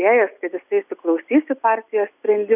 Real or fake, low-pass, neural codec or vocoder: real; 3.6 kHz; none